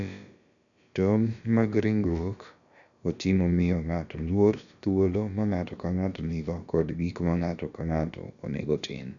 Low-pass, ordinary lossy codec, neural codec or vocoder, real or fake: 7.2 kHz; none; codec, 16 kHz, about 1 kbps, DyCAST, with the encoder's durations; fake